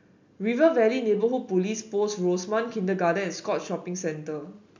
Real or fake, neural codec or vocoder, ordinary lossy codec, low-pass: real; none; MP3, 64 kbps; 7.2 kHz